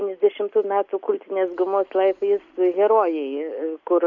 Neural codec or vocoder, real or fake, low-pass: none; real; 7.2 kHz